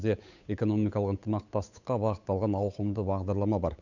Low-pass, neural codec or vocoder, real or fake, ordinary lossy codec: 7.2 kHz; codec, 16 kHz, 8 kbps, FunCodec, trained on Chinese and English, 25 frames a second; fake; none